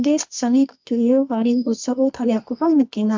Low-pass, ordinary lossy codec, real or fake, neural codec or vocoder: 7.2 kHz; MP3, 48 kbps; fake; codec, 24 kHz, 0.9 kbps, WavTokenizer, medium music audio release